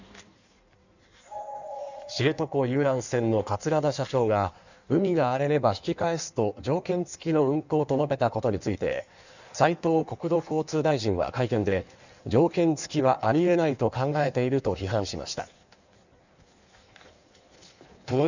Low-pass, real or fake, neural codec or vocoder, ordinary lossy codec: 7.2 kHz; fake; codec, 16 kHz in and 24 kHz out, 1.1 kbps, FireRedTTS-2 codec; none